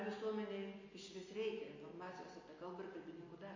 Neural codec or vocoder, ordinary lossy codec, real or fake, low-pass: none; MP3, 32 kbps; real; 7.2 kHz